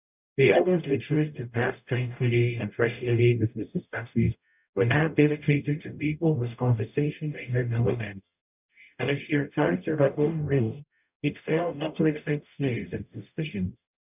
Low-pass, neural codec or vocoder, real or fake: 3.6 kHz; codec, 44.1 kHz, 0.9 kbps, DAC; fake